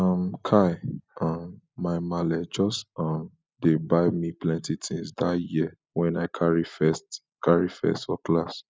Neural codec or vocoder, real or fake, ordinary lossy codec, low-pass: none; real; none; none